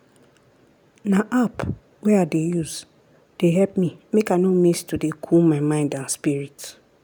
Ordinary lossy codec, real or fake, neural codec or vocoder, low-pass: none; real; none; none